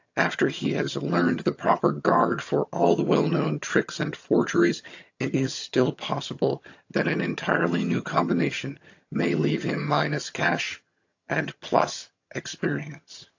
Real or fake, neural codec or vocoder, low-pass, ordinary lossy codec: fake; vocoder, 22.05 kHz, 80 mel bands, HiFi-GAN; 7.2 kHz; AAC, 48 kbps